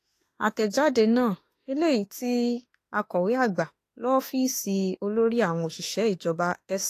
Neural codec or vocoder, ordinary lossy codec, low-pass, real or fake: autoencoder, 48 kHz, 32 numbers a frame, DAC-VAE, trained on Japanese speech; AAC, 48 kbps; 14.4 kHz; fake